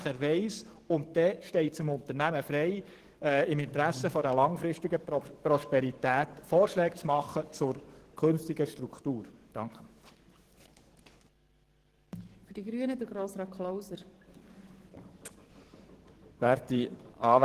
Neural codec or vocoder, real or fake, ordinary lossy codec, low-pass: codec, 44.1 kHz, 7.8 kbps, DAC; fake; Opus, 16 kbps; 14.4 kHz